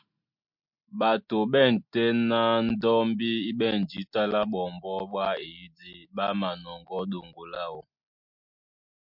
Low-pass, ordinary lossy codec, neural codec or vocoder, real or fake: 5.4 kHz; MP3, 48 kbps; none; real